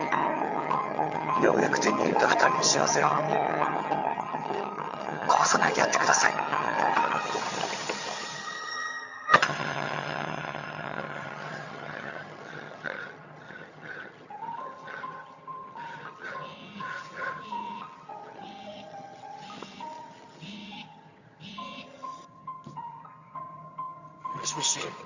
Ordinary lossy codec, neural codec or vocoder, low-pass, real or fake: Opus, 64 kbps; vocoder, 22.05 kHz, 80 mel bands, HiFi-GAN; 7.2 kHz; fake